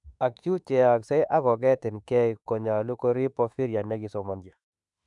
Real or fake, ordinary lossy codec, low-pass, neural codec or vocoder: fake; none; 10.8 kHz; autoencoder, 48 kHz, 32 numbers a frame, DAC-VAE, trained on Japanese speech